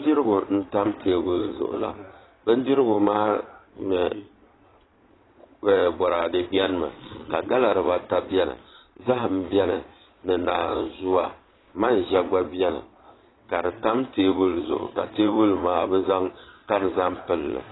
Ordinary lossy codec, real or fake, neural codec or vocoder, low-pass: AAC, 16 kbps; fake; vocoder, 22.05 kHz, 80 mel bands, Vocos; 7.2 kHz